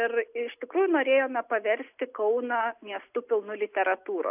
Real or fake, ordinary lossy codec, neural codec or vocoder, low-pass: real; AAC, 32 kbps; none; 3.6 kHz